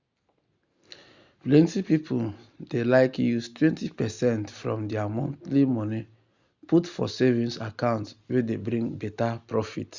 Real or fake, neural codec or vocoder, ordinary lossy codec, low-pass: real; none; Opus, 64 kbps; 7.2 kHz